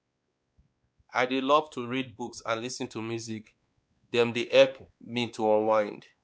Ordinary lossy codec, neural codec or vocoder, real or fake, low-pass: none; codec, 16 kHz, 2 kbps, X-Codec, WavLM features, trained on Multilingual LibriSpeech; fake; none